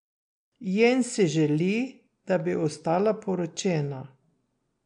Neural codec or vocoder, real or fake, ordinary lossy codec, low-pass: none; real; MP3, 64 kbps; 9.9 kHz